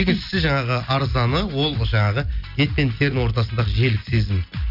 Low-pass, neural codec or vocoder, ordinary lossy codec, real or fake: 5.4 kHz; none; none; real